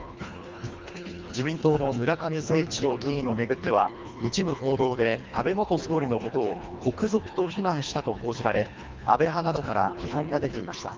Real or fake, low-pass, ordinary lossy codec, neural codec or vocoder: fake; 7.2 kHz; Opus, 32 kbps; codec, 24 kHz, 1.5 kbps, HILCodec